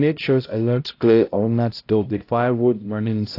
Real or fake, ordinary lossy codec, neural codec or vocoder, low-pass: fake; AAC, 32 kbps; codec, 16 kHz, 0.5 kbps, X-Codec, HuBERT features, trained on balanced general audio; 5.4 kHz